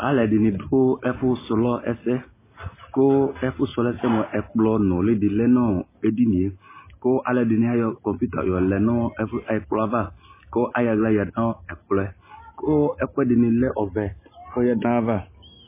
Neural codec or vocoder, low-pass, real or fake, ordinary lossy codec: none; 3.6 kHz; real; MP3, 16 kbps